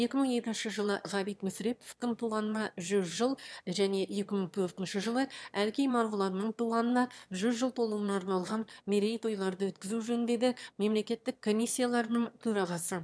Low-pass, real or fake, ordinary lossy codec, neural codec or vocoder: none; fake; none; autoencoder, 22.05 kHz, a latent of 192 numbers a frame, VITS, trained on one speaker